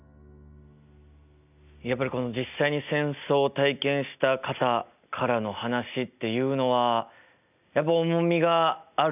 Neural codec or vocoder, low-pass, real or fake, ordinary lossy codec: none; 3.6 kHz; real; none